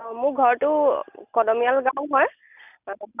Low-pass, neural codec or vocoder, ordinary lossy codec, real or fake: 3.6 kHz; none; none; real